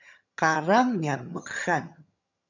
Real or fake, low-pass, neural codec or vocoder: fake; 7.2 kHz; vocoder, 22.05 kHz, 80 mel bands, HiFi-GAN